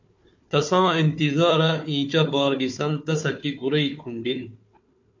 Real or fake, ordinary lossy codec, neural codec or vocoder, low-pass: fake; MP3, 48 kbps; codec, 16 kHz, 4 kbps, FunCodec, trained on Chinese and English, 50 frames a second; 7.2 kHz